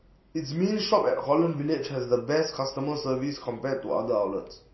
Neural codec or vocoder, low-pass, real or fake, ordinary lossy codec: none; 7.2 kHz; real; MP3, 24 kbps